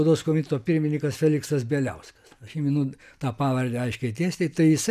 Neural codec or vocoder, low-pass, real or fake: none; 14.4 kHz; real